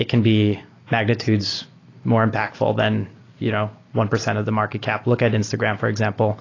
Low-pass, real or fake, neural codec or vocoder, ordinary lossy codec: 7.2 kHz; real; none; AAC, 32 kbps